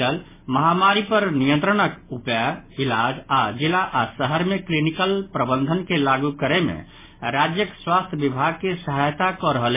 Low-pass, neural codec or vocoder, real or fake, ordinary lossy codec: 3.6 kHz; none; real; MP3, 16 kbps